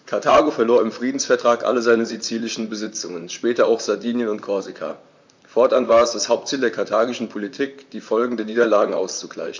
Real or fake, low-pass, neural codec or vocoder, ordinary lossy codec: fake; 7.2 kHz; vocoder, 44.1 kHz, 128 mel bands, Pupu-Vocoder; MP3, 64 kbps